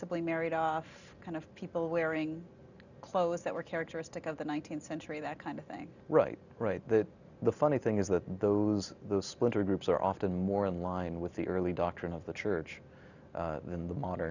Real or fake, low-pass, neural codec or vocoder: real; 7.2 kHz; none